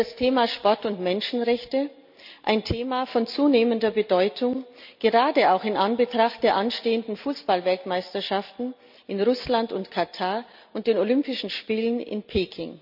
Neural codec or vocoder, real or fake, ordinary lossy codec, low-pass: none; real; none; 5.4 kHz